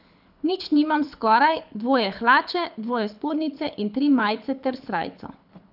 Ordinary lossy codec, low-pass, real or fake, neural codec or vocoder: none; 5.4 kHz; fake; codec, 24 kHz, 6 kbps, HILCodec